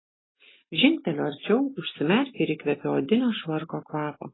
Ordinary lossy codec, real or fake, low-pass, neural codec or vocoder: AAC, 16 kbps; real; 7.2 kHz; none